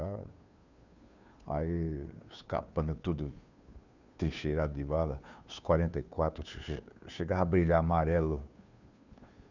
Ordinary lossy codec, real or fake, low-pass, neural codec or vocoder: none; fake; 7.2 kHz; codec, 16 kHz, 2 kbps, FunCodec, trained on Chinese and English, 25 frames a second